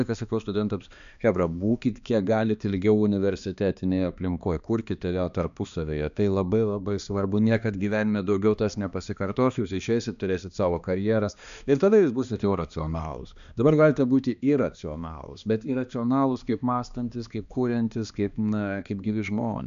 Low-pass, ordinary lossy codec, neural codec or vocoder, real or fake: 7.2 kHz; AAC, 96 kbps; codec, 16 kHz, 2 kbps, X-Codec, HuBERT features, trained on balanced general audio; fake